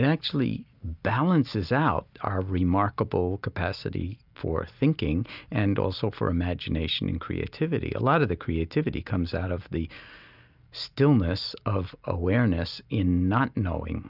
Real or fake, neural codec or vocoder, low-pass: real; none; 5.4 kHz